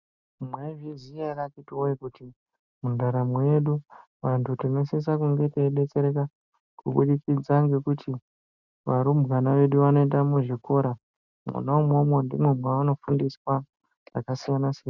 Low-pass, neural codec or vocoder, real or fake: 7.2 kHz; none; real